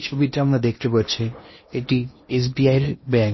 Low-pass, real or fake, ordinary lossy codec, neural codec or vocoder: 7.2 kHz; fake; MP3, 24 kbps; codec, 16 kHz in and 24 kHz out, 0.8 kbps, FocalCodec, streaming, 65536 codes